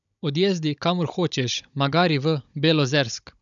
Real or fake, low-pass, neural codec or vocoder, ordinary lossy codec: fake; 7.2 kHz; codec, 16 kHz, 16 kbps, FunCodec, trained on Chinese and English, 50 frames a second; none